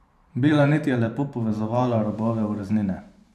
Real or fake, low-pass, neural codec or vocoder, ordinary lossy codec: fake; 14.4 kHz; vocoder, 44.1 kHz, 128 mel bands every 512 samples, BigVGAN v2; none